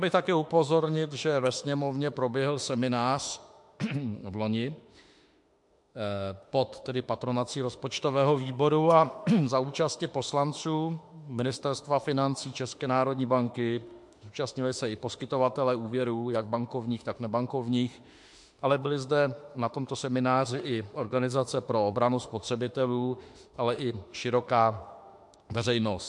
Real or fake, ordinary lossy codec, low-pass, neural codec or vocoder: fake; MP3, 64 kbps; 10.8 kHz; autoencoder, 48 kHz, 32 numbers a frame, DAC-VAE, trained on Japanese speech